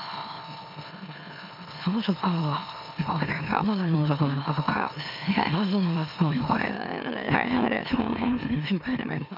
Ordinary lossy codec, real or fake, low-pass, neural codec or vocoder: MP3, 48 kbps; fake; 5.4 kHz; autoencoder, 44.1 kHz, a latent of 192 numbers a frame, MeloTTS